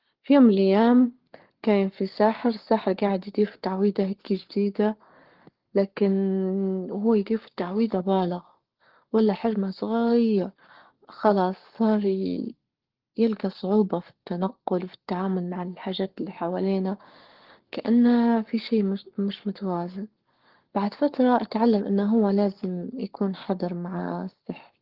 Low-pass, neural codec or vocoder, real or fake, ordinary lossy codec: 5.4 kHz; codec, 44.1 kHz, 7.8 kbps, Pupu-Codec; fake; Opus, 16 kbps